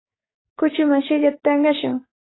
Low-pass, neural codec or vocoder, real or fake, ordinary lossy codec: 7.2 kHz; codec, 44.1 kHz, 7.8 kbps, DAC; fake; AAC, 16 kbps